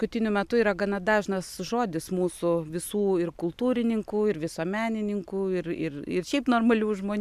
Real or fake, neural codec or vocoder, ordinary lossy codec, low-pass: real; none; AAC, 96 kbps; 14.4 kHz